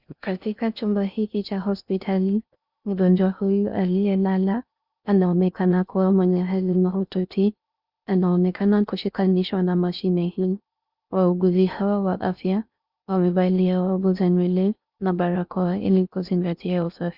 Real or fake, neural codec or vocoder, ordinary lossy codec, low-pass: fake; codec, 16 kHz in and 24 kHz out, 0.6 kbps, FocalCodec, streaming, 4096 codes; Opus, 64 kbps; 5.4 kHz